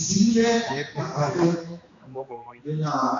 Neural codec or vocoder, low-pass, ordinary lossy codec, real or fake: codec, 16 kHz, 2 kbps, X-Codec, HuBERT features, trained on general audio; 7.2 kHz; AAC, 48 kbps; fake